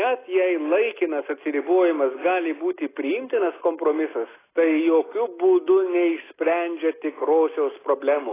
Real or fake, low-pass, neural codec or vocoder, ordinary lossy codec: real; 3.6 kHz; none; AAC, 16 kbps